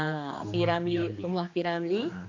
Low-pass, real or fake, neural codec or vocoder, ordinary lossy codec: 7.2 kHz; fake; codec, 16 kHz, 2 kbps, X-Codec, HuBERT features, trained on general audio; AAC, 48 kbps